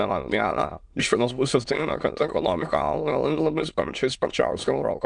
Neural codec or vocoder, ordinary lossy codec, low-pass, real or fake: autoencoder, 22.05 kHz, a latent of 192 numbers a frame, VITS, trained on many speakers; Opus, 64 kbps; 9.9 kHz; fake